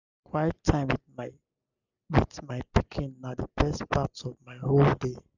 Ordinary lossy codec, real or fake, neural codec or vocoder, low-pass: none; real; none; 7.2 kHz